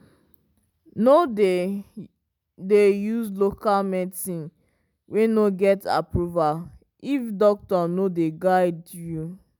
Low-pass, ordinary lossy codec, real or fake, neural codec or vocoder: 19.8 kHz; none; real; none